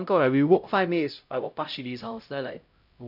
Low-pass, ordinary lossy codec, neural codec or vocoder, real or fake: 5.4 kHz; none; codec, 16 kHz, 0.5 kbps, X-Codec, HuBERT features, trained on LibriSpeech; fake